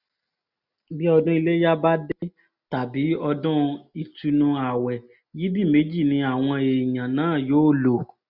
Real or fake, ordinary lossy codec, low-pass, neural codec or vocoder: real; none; 5.4 kHz; none